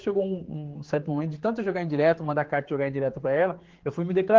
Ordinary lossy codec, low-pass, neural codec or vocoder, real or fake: Opus, 16 kbps; 7.2 kHz; codec, 16 kHz, 4 kbps, X-Codec, HuBERT features, trained on general audio; fake